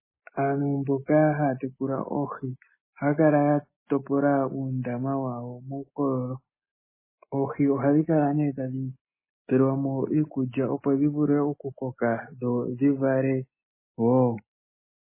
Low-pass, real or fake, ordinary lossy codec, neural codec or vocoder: 3.6 kHz; real; MP3, 16 kbps; none